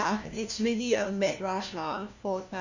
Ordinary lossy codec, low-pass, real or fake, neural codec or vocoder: none; 7.2 kHz; fake; codec, 16 kHz, 1 kbps, FunCodec, trained on LibriTTS, 50 frames a second